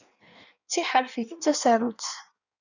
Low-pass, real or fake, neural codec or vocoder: 7.2 kHz; fake; codec, 16 kHz in and 24 kHz out, 1.1 kbps, FireRedTTS-2 codec